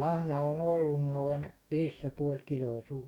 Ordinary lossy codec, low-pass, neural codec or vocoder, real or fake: none; 19.8 kHz; codec, 44.1 kHz, 2.6 kbps, DAC; fake